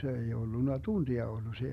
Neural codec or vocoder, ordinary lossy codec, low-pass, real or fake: none; MP3, 96 kbps; 14.4 kHz; real